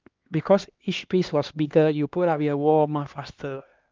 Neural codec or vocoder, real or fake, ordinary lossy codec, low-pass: codec, 16 kHz, 2 kbps, X-Codec, HuBERT features, trained on LibriSpeech; fake; Opus, 32 kbps; 7.2 kHz